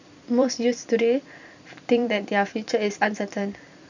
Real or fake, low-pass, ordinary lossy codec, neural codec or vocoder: fake; 7.2 kHz; none; vocoder, 44.1 kHz, 128 mel bands every 256 samples, BigVGAN v2